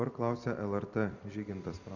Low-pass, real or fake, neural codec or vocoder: 7.2 kHz; fake; vocoder, 44.1 kHz, 128 mel bands every 256 samples, BigVGAN v2